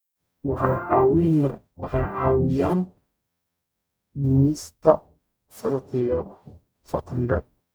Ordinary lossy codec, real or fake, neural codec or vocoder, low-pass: none; fake; codec, 44.1 kHz, 0.9 kbps, DAC; none